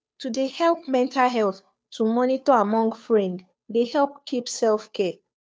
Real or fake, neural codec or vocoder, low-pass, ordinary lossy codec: fake; codec, 16 kHz, 2 kbps, FunCodec, trained on Chinese and English, 25 frames a second; none; none